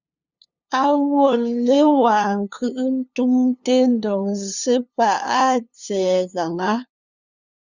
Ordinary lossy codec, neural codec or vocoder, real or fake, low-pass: Opus, 64 kbps; codec, 16 kHz, 2 kbps, FunCodec, trained on LibriTTS, 25 frames a second; fake; 7.2 kHz